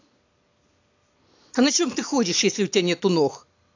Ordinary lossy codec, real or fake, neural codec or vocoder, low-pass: AAC, 48 kbps; real; none; 7.2 kHz